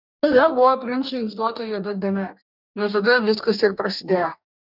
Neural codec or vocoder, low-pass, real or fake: codec, 44.1 kHz, 2.6 kbps, DAC; 5.4 kHz; fake